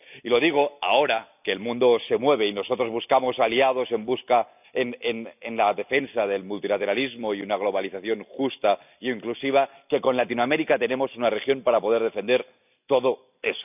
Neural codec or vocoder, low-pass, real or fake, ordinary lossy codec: none; 3.6 kHz; real; none